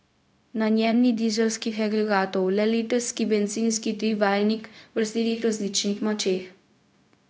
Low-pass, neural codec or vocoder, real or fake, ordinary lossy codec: none; codec, 16 kHz, 0.4 kbps, LongCat-Audio-Codec; fake; none